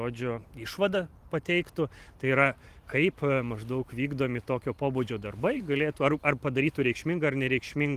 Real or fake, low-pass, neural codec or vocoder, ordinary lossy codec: fake; 14.4 kHz; vocoder, 44.1 kHz, 128 mel bands every 512 samples, BigVGAN v2; Opus, 24 kbps